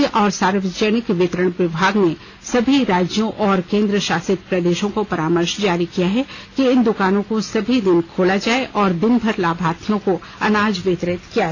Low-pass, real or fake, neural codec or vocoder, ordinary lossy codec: 7.2 kHz; real; none; AAC, 32 kbps